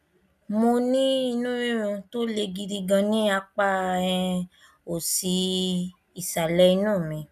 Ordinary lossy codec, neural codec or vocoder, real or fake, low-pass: none; none; real; 14.4 kHz